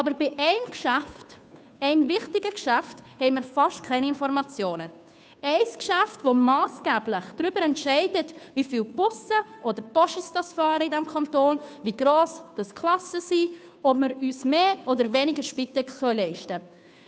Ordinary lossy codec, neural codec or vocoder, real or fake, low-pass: none; codec, 16 kHz, 2 kbps, FunCodec, trained on Chinese and English, 25 frames a second; fake; none